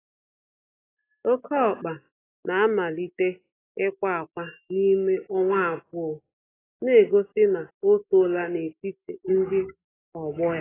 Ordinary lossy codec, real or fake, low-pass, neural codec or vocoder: AAC, 16 kbps; real; 3.6 kHz; none